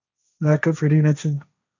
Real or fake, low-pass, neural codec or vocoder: fake; 7.2 kHz; codec, 16 kHz, 1.1 kbps, Voila-Tokenizer